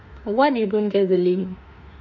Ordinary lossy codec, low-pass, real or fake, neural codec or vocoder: none; 7.2 kHz; fake; autoencoder, 48 kHz, 32 numbers a frame, DAC-VAE, trained on Japanese speech